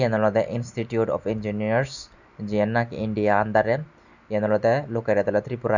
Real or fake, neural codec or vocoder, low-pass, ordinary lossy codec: real; none; 7.2 kHz; none